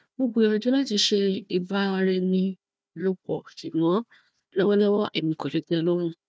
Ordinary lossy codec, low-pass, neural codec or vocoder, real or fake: none; none; codec, 16 kHz, 1 kbps, FunCodec, trained on Chinese and English, 50 frames a second; fake